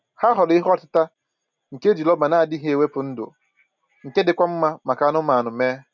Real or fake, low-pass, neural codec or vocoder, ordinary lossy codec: real; 7.2 kHz; none; none